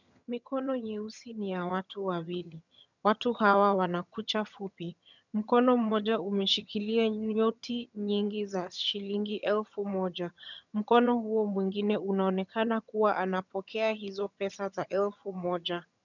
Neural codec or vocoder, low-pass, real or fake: vocoder, 22.05 kHz, 80 mel bands, HiFi-GAN; 7.2 kHz; fake